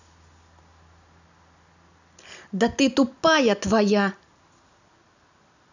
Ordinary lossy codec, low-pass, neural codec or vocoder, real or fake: none; 7.2 kHz; none; real